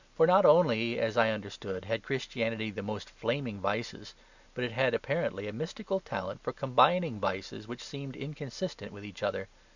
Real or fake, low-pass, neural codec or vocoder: real; 7.2 kHz; none